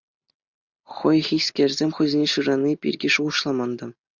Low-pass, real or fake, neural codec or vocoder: 7.2 kHz; real; none